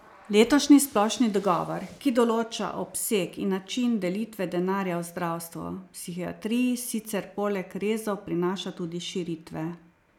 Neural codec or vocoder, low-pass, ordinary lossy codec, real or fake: none; 19.8 kHz; none; real